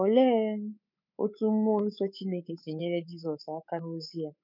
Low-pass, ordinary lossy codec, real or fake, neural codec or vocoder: 5.4 kHz; none; fake; codec, 24 kHz, 3.1 kbps, DualCodec